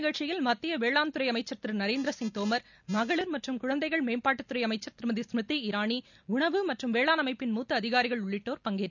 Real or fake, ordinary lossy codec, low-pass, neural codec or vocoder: real; none; 7.2 kHz; none